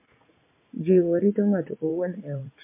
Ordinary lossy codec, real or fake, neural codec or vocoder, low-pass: MP3, 24 kbps; fake; vocoder, 44.1 kHz, 80 mel bands, Vocos; 3.6 kHz